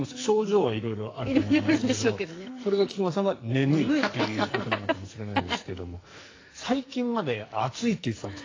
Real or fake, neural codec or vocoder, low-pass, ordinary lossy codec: fake; codec, 44.1 kHz, 2.6 kbps, SNAC; 7.2 kHz; AAC, 32 kbps